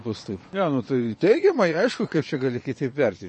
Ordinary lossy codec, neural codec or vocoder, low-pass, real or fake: MP3, 32 kbps; vocoder, 22.05 kHz, 80 mel bands, Vocos; 9.9 kHz; fake